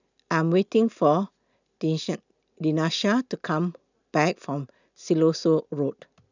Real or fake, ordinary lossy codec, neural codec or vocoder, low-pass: real; none; none; 7.2 kHz